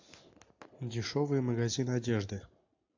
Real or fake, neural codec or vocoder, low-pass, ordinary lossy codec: real; none; 7.2 kHz; AAC, 48 kbps